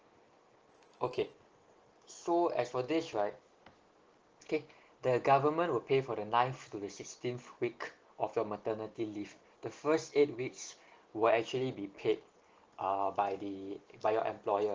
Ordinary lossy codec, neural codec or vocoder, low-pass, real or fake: Opus, 16 kbps; none; 7.2 kHz; real